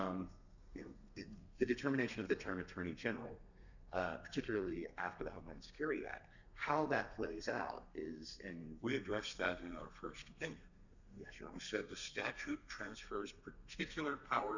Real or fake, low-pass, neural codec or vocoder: fake; 7.2 kHz; codec, 32 kHz, 1.9 kbps, SNAC